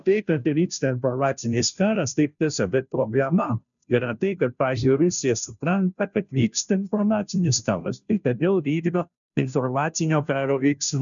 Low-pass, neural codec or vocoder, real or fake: 7.2 kHz; codec, 16 kHz, 0.5 kbps, FunCodec, trained on Chinese and English, 25 frames a second; fake